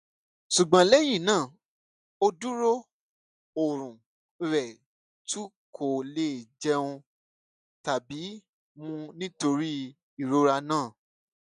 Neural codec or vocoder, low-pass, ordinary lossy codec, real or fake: none; 10.8 kHz; none; real